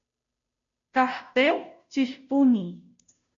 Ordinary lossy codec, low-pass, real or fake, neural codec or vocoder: MP3, 64 kbps; 7.2 kHz; fake; codec, 16 kHz, 0.5 kbps, FunCodec, trained on Chinese and English, 25 frames a second